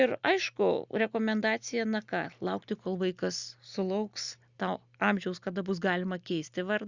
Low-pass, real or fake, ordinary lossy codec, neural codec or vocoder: 7.2 kHz; real; Opus, 64 kbps; none